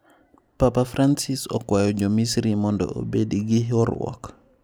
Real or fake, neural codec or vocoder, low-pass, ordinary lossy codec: real; none; none; none